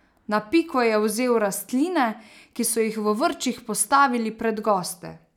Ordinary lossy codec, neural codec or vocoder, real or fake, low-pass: none; none; real; 19.8 kHz